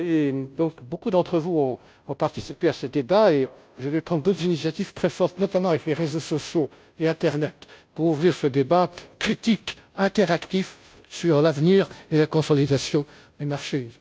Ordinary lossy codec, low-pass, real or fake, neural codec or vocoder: none; none; fake; codec, 16 kHz, 0.5 kbps, FunCodec, trained on Chinese and English, 25 frames a second